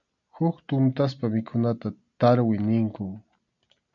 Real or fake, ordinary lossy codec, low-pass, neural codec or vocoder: real; MP3, 64 kbps; 7.2 kHz; none